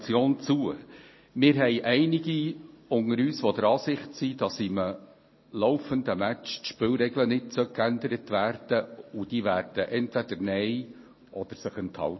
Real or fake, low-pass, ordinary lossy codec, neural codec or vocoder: real; 7.2 kHz; MP3, 24 kbps; none